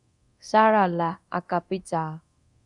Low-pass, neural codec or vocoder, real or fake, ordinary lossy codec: 10.8 kHz; codec, 24 kHz, 0.9 kbps, DualCodec; fake; Opus, 64 kbps